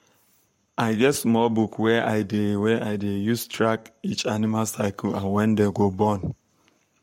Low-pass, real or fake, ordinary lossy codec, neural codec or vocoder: 19.8 kHz; fake; MP3, 64 kbps; codec, 44.1 kHz, 7.8 kbps, Pupu-Codec